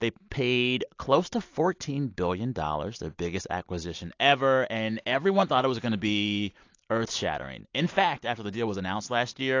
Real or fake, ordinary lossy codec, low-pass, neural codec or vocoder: real; AAC, 48 kbps; 7.2 kHz; none